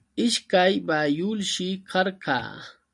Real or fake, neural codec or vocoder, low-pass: real; none; 10.8 kHz